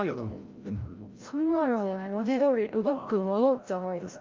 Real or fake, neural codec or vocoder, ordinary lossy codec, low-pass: fake; codec, 16 kHz, 0.5 kbps, FreqCodec, larger model; Opus, 24 kbps; 7.2 kHz